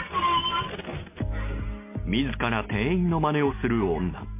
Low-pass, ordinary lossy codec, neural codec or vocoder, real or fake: 3.6 kHz; MP3, 24 kbps; none; real